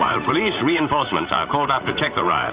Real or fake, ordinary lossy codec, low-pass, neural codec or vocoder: fake; Opus, 64 kbps; 3.6 kHz; vocoder, 44.1 kHz, 80 mel bands, Vocos